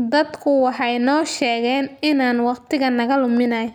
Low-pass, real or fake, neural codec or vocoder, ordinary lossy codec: 19.8 kHz; real; none; none